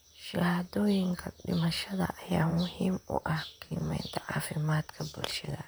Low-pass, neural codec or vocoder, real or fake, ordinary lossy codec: none; vocoder, 44.1 kHz, 128 mel bands, Pupu-Vocoder; fake; none